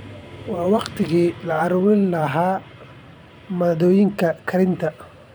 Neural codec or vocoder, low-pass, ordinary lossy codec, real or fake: vocoder, 44.1 kHz, 128 mel bands every 512 samples, BigVGAN v2; none; none; fake